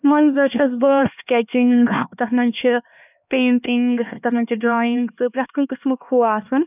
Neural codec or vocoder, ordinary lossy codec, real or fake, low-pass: codec, 16 kHz, 2 kbps, X-Codec, HuBERT features, trained on LibriSpeech; none; fake; 3.6 kHz